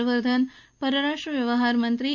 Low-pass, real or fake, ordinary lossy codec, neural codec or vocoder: 7.2 kHz; real; none; none